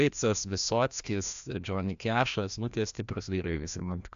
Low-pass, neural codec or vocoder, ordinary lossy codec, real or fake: 7.2 kHz; codec, 16 kHz, 1 kbps, FreqCodec, larger model; AAC, 96 kbps; fake